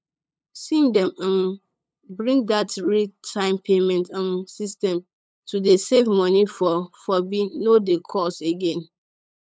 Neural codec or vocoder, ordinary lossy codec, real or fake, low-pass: codec, 16 kHz, 8 kbps, FunCodec, trained on LibriTTS, 25 frames a second; none; fake; none